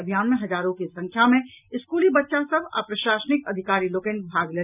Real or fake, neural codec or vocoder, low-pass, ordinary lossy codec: real; none; 3.6 kHz; none